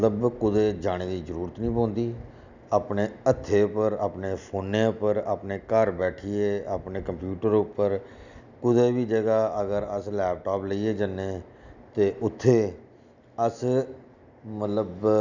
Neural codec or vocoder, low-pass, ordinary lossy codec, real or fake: none; 7.2 kHz; none; real